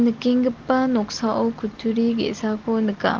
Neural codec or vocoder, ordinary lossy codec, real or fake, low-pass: none; Opus, 16 kbps; real; 7.2 kHz